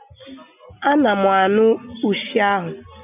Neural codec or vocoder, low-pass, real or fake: none; 3.6 kHz; real